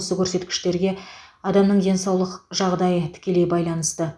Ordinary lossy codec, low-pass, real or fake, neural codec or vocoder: none; none; real; none